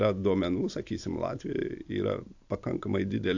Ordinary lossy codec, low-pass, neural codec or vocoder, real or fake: AAC, 48 kbps; 7.2 kHz; none; real